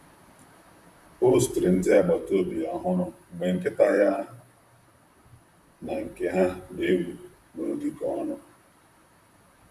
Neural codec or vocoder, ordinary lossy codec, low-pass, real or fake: vocoder, 44.1 kHz, 128 mel bands, Pupu-Vocoder; none; 14.4 kHz; fake